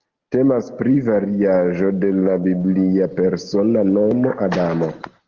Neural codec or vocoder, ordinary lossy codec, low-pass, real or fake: none; Opus, 16 kbps; 7.2 kHz; real